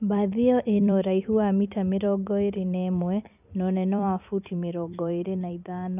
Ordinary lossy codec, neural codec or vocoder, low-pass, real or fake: none; vocoder, 44.1 kHz, 128 mel bands every 256 samples, BigVGAN v2; 3.6 kHz; fake